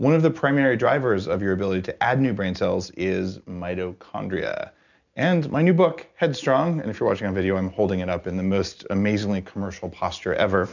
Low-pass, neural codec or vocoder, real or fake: 7.2 kHz; none; real